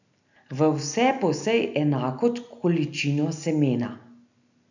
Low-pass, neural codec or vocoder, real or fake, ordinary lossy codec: 7.2 kHz; none; real; none